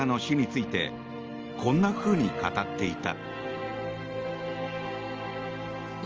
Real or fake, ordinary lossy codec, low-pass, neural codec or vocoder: real; Opus, 24 kbps; 7.2 kHz; none